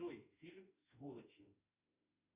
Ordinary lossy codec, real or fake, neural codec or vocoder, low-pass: Opus, 64 kbps; fake; autoencoder, 48 kHz, 32 numbers a frame, DAC-VAE, trained on Japanese speech; 3.6 kHz